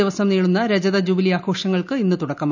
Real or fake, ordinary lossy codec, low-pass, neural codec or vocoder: real; none; 7.2 kHz; none